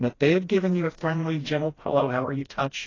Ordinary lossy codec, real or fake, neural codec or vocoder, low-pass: AAC, 32 kbps; fake; codec, 16 kHz, 1 kbps, FreqCodec, smaller model; 7.2 kHz